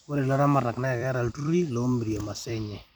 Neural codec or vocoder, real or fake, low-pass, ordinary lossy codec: vocoder, 44.1 kHz, 128 mel bands every 256 samples, BigVGAN v2; fake; 19.8 kHz; none